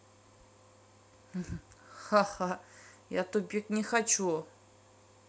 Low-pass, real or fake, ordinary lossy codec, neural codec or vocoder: none; real; none; none